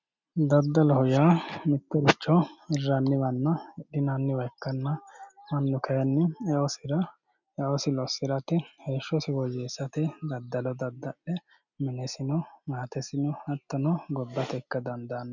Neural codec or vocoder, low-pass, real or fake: none; 7.2 kHz; real